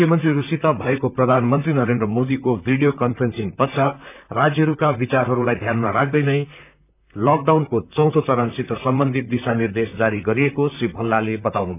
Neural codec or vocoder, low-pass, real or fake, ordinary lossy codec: vocoder, 44.1 kHz, 128 mel bands, Pupu-Vocoder; 3.6 kHz; fake; none